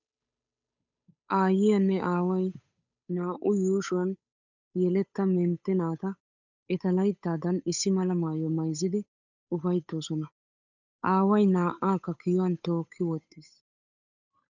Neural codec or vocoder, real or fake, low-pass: codec, 16 kHz, 8 kbps, FunCodec, trained on Chinese and English, 25 frames a second; fake; 7.2 kHz